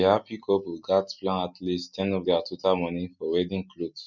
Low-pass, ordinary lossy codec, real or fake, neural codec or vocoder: 7.2 kHz; none; real; none